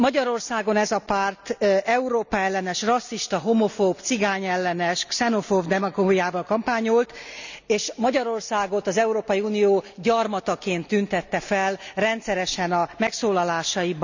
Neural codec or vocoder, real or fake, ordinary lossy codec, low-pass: none; real; none; 7.2 kHz